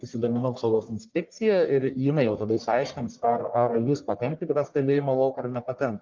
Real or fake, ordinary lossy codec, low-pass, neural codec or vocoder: fake; Opus, 32 kbps; 7.2 kHz; codec, 44.1 kHz, 1.7 kbps, Pupu-Codec